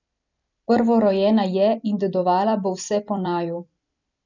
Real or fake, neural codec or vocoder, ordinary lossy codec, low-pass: fake; vocoder, 44.1 kHz, 128 mel bands every 256 samples, BigVGAN v2; none; 7.2 kHz